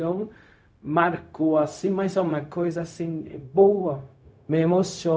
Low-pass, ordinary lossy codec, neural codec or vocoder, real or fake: none; none; codec, 16 kHz, 0.4 kbps, LongCat-Audio-Codec; fake